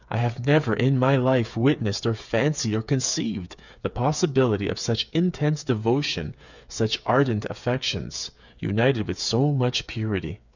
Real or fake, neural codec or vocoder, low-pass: fake; codec, 16 kHz, 8 kbps, FreqCodec, smaller model; 7.2 kHz